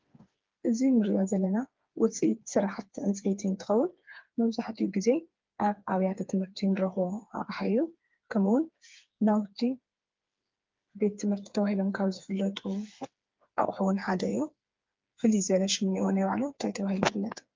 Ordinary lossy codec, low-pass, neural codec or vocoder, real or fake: Opus, 32 kbps; 7.2 kHz; codec, 16 kHz, 4 kbps, FreqCodec, smaller model; fake